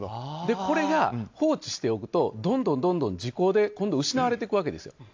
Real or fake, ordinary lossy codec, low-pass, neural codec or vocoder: real; AAC, 48 kbps; 7.2 kHz; none